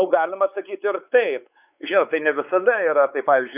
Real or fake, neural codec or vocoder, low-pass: fake; codec, 16 kHz, 4 kbps, X-Codec, WavLM features, trained on Multilingual LibriSpeech; 3.6 kHz